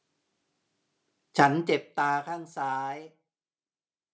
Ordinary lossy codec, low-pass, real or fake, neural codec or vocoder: none; none; real; none